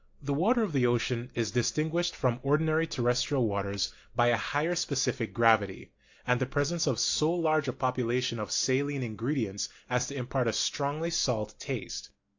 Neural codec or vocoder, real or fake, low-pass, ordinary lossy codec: none; real; 7.2 kHz; AAC, 48 kbps